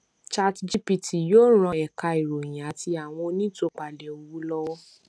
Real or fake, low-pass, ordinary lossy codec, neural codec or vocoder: real; none; none; none